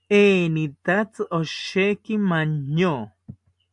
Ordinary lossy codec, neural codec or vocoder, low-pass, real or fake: MP3, 64 kbps; none; 10.8 kHz; real